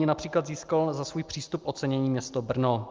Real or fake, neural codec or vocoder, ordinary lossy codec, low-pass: real; none; Opus, 24 kbps; 7.2 kHz